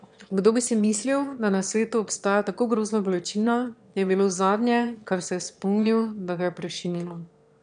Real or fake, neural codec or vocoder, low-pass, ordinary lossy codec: fake; autoencoder, 22.05 kHz, a latent of 192 numbers a frame, VITS, trained on one speaker; 9.9 kHz; none